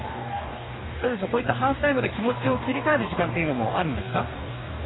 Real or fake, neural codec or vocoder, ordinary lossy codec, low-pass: fake; codec, 44.1 kHz, 2.6 kbps, DAC; AAC, 16 kbps; 7.2 kHz